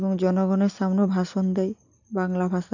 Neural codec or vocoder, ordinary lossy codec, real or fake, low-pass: none; none; real; 7.2 kHz